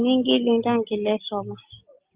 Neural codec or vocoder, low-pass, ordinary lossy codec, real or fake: none; 3.6 kHz; Opus, 24 kbps; real